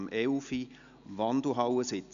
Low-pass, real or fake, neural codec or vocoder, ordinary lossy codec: 7.2 kHz; real; none; none